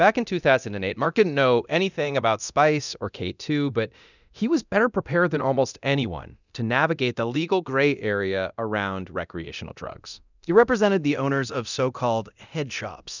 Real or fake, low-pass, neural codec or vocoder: fake; 7.2 kHz; codec, 24 kHz, 0.9 kbps, DualCodec